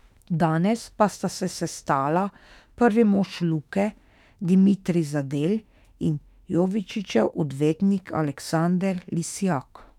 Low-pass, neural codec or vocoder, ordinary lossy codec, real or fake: 19.8 kHz; autoencoder, 48 kHz, 32 numbers a frame, DAC-VAE, trained on Japanese speech; none; fake